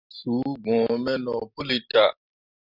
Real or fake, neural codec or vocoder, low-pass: real; none; 5.4 kHz